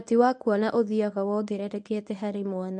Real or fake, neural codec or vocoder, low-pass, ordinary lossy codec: fake; codec, 24 kHz, 0.9 kbps, WavTokenizer, medium speech release version 1; none; none